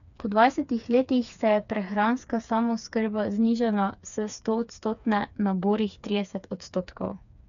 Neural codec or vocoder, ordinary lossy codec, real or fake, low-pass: codec, 16 kHz, 4 kbps, FreqCodec, smaller model; none; fake; 7.2 kHz